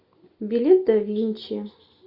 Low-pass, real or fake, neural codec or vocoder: 5.4 kHz; real; none